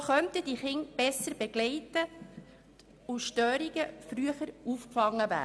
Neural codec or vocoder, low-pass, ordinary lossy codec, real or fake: none; none; none; real